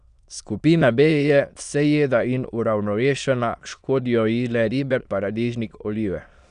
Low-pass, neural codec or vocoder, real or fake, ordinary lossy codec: 9.9 kHz; autoencoder, 22.05 kHz, a latent of 192 numbers a frame, VITS, trained on many speakers; fake; none